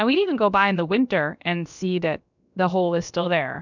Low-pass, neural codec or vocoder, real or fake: 7.2 kHz; codec, 16 kHz, about 1 kbps, DyCAST, with the encoder's durations; fake